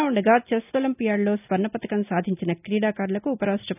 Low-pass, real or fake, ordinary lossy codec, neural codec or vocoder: 3.6 kHz; real; none; none